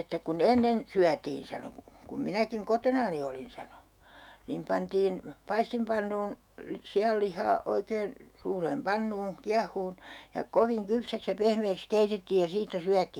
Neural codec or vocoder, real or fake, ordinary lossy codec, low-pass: autoencoder, 48 kHz, 128 numbers a frame, DAC-VAE, trained on Japanese speech; fake; none; 19.8 kHz